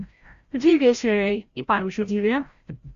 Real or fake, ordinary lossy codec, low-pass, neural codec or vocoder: fake; Opus, 64 kbps; 7.2 kHz; codec, 16 kHz, 0.5 kbps, FreqCodec, larger model